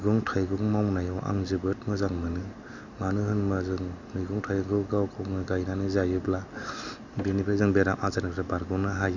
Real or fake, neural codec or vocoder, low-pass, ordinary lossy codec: real; none; 7.2 kHz; none